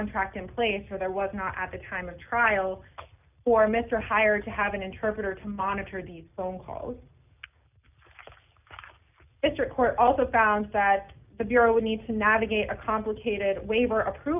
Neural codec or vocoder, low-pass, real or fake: none; 3.6 kHz; real